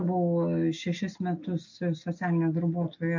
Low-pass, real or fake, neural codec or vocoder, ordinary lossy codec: 7.2 kHz; real; none; MP3, 48 kbps